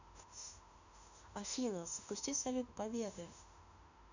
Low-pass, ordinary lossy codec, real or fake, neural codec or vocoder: 7.2 kHz; none; fake; autoencoder, 48 kHz, 32 numbers a frame, DAC-VAE, trained on Japanese speech